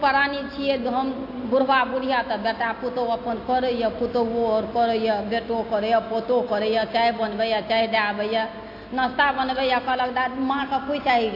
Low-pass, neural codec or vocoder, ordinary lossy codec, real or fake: 5.4 kHz; none; AAC, 32 kbps; real